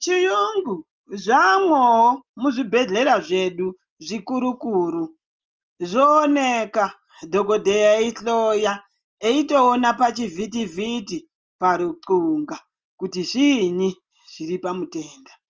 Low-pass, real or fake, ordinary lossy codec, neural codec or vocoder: 7.2 kHz; real; Opus, 24 kbps; none